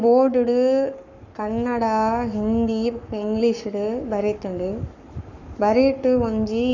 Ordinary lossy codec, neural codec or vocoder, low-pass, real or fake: none; codec, 44.1 kHz, 7.8 kbps, Pupu-Codec; 7.2 kHz; fake